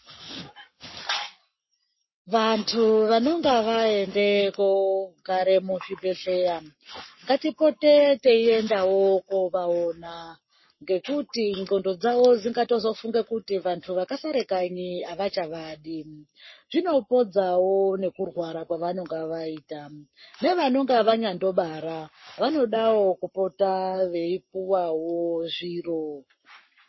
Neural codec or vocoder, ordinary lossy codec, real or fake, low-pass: codec, 44.1 kHz, 7.8 kbps, Pupu-Codec; MP3, 24 kbps; fake; 7.2 kHz